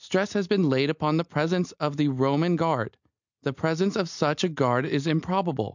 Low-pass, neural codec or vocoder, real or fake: 7.2 kHz; none; real